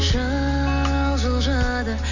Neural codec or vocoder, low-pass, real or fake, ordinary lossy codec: none; 7.2 kHz; real; none